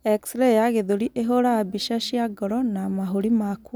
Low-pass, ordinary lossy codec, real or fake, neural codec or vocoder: none; none; real; none